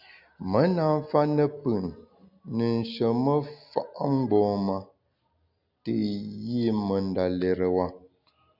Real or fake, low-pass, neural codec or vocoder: real; 5.4 kHz; none